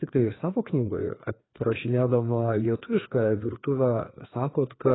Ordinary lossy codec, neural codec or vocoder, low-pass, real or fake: AAC, 16 kbps; codec, 16 kHz, 2 kbps, FreqCodec, larger model; 7.2 kHz; fake